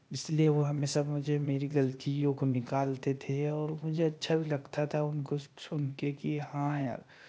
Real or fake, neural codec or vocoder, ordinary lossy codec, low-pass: fake; codec, 16 kHz, 0.8 kbps, ZipCodec; none; none